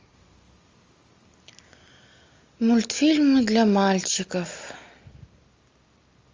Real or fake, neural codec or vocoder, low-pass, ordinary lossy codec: real; none; 7.2 kHz; Opus, 32 kbps